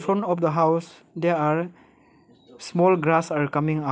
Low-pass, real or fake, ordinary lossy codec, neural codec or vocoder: none; real; none; none